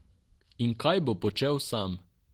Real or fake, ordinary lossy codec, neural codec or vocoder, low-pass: real; Opus, 16 kbps; none; 19.8 kHz